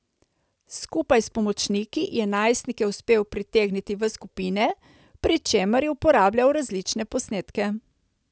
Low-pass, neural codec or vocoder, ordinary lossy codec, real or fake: none; none; none; real